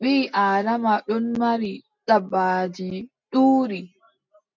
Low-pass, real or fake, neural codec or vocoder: 7.2 kHz; real; none